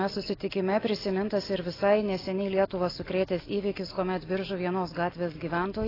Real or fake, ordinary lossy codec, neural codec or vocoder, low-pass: real; AAC, 24 kbps; none; 5.4 kHz